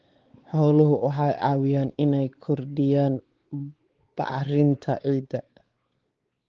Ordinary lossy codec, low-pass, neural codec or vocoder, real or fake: Opus, 16 kbps; 7.2 kHz; codec, 16 kHz, 4 kbps, X-Codec, WavLM features, trained on Multilingual LibriSpeech; fake